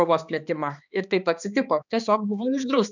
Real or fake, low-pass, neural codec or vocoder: fake; 7.2 kHz; codec, 16 kHz, 2 kbps, X-Codec, HuBERT features, trained on balanced general audio